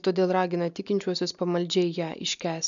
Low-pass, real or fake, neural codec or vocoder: 7.2 kHz; real; none